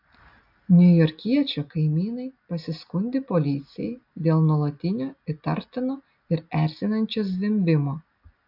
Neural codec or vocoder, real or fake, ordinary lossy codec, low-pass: none; real; AAC, 48 kbps; 5.4 kHz